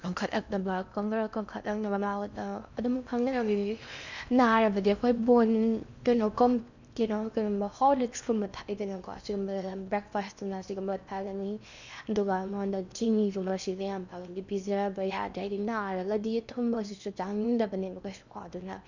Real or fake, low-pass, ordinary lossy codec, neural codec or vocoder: fake; 7.2 kHz; none; codec, 16 kHz in and 24 kHz out, 0.8 kbps, FocalCodec, streaming, 65536 codes